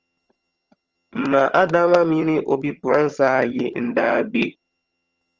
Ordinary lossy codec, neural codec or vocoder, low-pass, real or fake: Opus, 24 kbps; vocoder, 22.05 kHz, 80 mel bands, HiFi-GAN; 7.2 kHz; fake